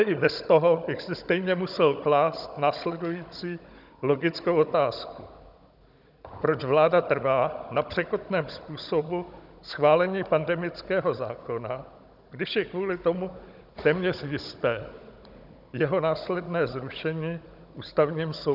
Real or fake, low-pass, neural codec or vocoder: fake; 5.4 kHz; codec, 16 kHz, 16 kbps, FunCodec, trained on Chinese and English, 50 frames a second